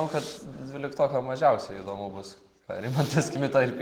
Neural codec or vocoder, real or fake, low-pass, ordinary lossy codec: none; real; 19.8 kHz; Opus, 16 kbps